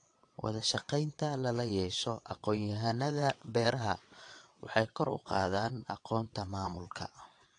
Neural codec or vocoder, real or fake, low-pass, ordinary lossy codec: vocoder, 22.05 kHz, 80 mel bands, WaveNeXt; fake; 9.9 kHz; AAC, 48 kbps